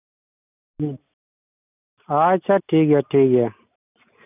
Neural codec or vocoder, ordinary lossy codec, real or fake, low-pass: none; none; real; 3.6 kHz